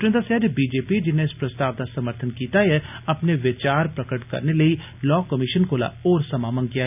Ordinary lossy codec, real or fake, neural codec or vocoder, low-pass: none; real; none; 3.6 kHz